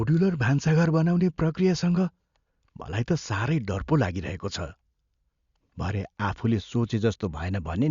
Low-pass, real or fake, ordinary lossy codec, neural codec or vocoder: 7.2 kHz; real; Opus, 64 kbps; none